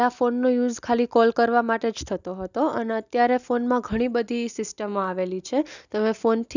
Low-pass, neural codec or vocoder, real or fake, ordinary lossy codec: 7.2 kHz; none; real; none